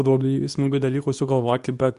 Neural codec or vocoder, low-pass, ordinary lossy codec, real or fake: codec, 24 kHz, 0.9 kbps, WavTokenizer, medium speech release version 2; 10.8 kHz; Opus, 64 kbps; fake